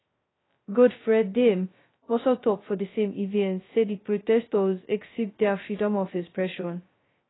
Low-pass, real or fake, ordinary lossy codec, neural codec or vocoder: 7.2 kHz; fake; AAC, 16 kbps; codec, 16 kHz, 0.2 kbps, FocalCodec